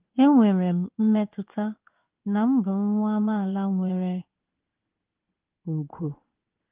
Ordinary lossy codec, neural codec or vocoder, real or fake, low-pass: Opus, 24 kbps; codec, 16 kHz in and 24 kHz out, 1 kbps, XY-Tokenizer; fake; 3.6 kHz